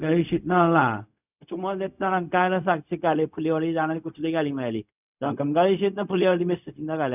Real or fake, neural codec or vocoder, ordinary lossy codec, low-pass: fake; codec, 16 kHz, 0.4 kbps, LongCat-Audio-Codec; none; 3.6 kHz